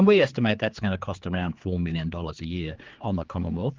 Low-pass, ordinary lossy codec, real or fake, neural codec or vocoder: 7.2 kHz; Opus, 32 kbps; fake; codec, 16 kHz, 4 kbps, X-Codec, HuBERT features, trained on general audio